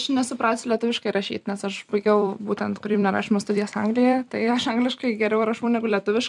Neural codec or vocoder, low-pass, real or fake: vocoder, 44.1 kHz, 128 mel bands every 256 samples, BigVGAN v2; 10.8 kHz; fake